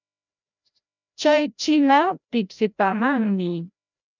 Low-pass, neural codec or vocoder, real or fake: 7.2 kHz; codec, 16 kHz, 0.5 kbps, FreqCodec, larger model; fake